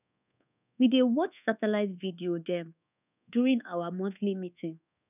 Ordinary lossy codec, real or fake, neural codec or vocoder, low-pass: none; fake; codec, 24 kHz, 1.2 kbps, DualCodec; 3.6 kHz